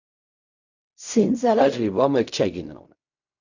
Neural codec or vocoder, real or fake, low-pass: codec, 16 kHz in and 24 kHz out, 0.4 kbps, LongCat-Audio-Codec, fine tuned four codebook decoder; fake; 7.2 kHz